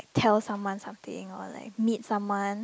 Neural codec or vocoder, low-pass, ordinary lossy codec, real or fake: none; none; none; real